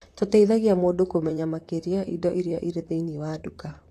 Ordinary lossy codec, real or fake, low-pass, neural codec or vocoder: AAC, 64 kbps; fake; 14.4 kHz; vocoder, 44.1 kHz, 128 mel bands, Pupu-Vocoder